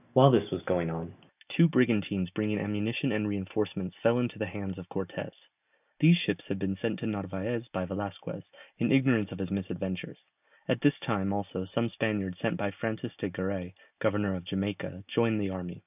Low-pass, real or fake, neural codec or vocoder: 3.6 kHz; real; none